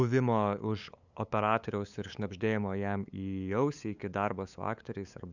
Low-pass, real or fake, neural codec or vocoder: 7.2 kHz; fake; codec, 16 kHz, 8 kbps, FunCodec, trained on LibriTTS, 25 frames a second